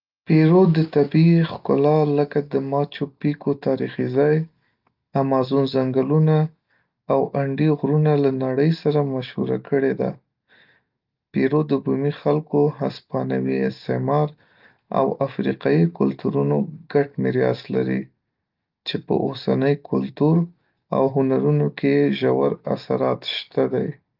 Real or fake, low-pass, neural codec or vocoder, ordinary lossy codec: real; 5.4 kHz; none; Opus, 24 kbps